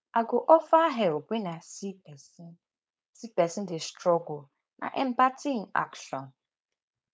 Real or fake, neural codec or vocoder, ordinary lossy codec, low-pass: fake; codec, 16 kHz, 4.8 kbps, FACodec; none; none